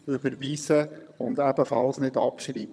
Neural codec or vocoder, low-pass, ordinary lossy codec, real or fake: vocoder, 22.05 kHz, 80 mel bands, HiFi-GAN; none; none; fake